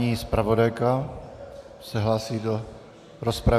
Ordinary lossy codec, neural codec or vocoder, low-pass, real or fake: AAC, 96 kbps; none; 14.4 kHz; real